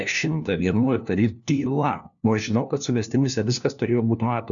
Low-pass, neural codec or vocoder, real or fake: 7.2 kHz; codec, 16 kHz, 1 kbps, FunCodec, trained on LibriTTS, 50 frames a second; fake